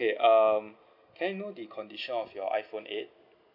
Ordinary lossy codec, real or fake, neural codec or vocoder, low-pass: none; real; none; 5.4 kHz